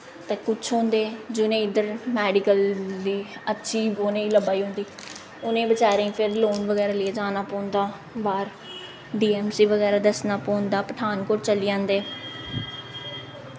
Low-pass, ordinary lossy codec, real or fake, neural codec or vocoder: none; none; real; none